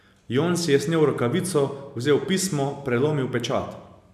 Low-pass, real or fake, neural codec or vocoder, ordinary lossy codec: 14.4 kHz; real; none; none